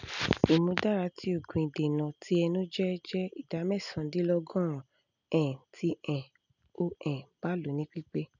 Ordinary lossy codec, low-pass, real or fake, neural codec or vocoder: none; 7.2 kHz; real; none